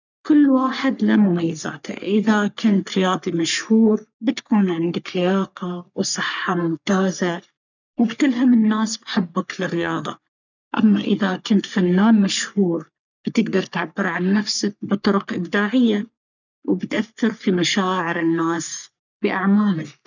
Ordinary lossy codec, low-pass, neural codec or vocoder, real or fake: none; 7.2 kHz; codec, 44.1 kHz, 3.4 kbps, Pupu-Codec; fake